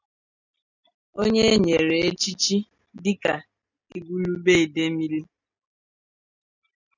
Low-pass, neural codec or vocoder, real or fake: 7.2 kHz; none; real